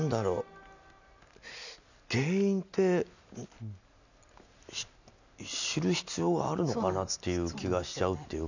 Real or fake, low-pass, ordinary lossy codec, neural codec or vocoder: real; 7.2 kHz; none; none